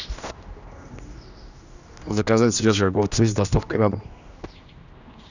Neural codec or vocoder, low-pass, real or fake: codec, 16 kHz, 1 kbps, X-Codec, HuBERT features, trained on general audio; 7.2 kHz; fake